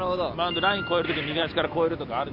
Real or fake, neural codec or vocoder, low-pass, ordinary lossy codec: real; none; 5.4 kHz; MP3, 48 kbps